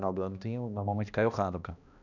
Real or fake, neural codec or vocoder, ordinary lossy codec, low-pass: fake; codec, 16 kHz, 1 kbps, X-Codec, HuBERT features, trained on balanced general audio; none; 7.2 kHz